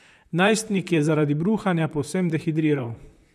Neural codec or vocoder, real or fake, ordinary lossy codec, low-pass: vocoder, 44.1 kHz, 128 mel bands, Pupu-Vocoder; fake; none; 14.4 kHz